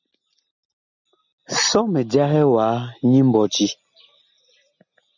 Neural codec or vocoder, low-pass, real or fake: none; 7.2 kHz; real